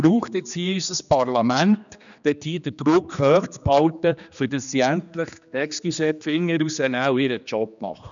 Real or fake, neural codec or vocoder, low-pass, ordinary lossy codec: fake; codec, 16 kHz, 2 kbps, X-Codec, HuBERT features, trained on general audio; 7.2 kHz; none